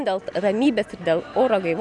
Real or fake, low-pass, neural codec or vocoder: real; 10.8 kHz; none